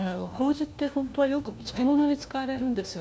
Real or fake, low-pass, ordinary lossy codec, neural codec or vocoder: fake; none; none; codec, 16 kHz, 1 kbps, FunCodec, trained on LibriTTS, 50 frames a second